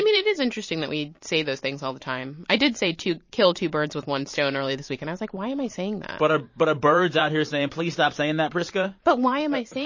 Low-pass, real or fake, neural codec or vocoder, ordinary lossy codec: 7.2 kHz; fake; vocoder, 44.1 kHz, 128 mel bands every 512 samples, BigVGAN v2; MP3, 32 kbps